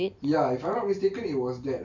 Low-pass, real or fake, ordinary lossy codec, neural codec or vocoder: 7.2 kHz; real; none; none